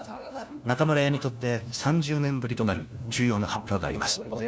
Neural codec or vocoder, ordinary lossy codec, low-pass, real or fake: codec, 16 kHz, 1 kbps, FunCodec, trained on LibriTTS, 50 frames a second; none; none; fake